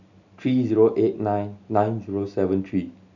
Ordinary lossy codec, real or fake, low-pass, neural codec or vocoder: none; real; 7.2 kHz; none